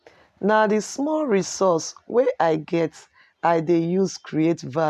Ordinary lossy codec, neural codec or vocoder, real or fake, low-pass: none; none; real; 14.4 kHz